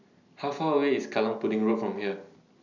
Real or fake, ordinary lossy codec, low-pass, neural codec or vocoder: real; none; 7.2 kHz; none